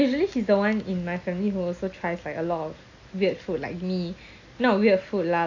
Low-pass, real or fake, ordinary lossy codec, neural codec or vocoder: 7.2 kHz; real; AAC, 48 kbps; none